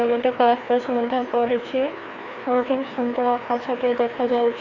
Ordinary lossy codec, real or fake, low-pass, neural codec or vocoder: none; fake; 7.2 kHz; codec, 16 kHz, 2 kbps, FreqCodec, larger model